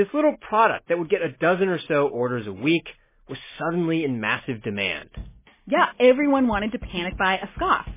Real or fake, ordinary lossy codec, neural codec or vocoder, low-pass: real; MP3, 16 kbps; none; 3.6 kHz